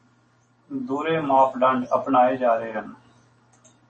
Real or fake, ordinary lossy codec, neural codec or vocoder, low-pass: real; MP3, 32 kbps; none; 9.9 kHz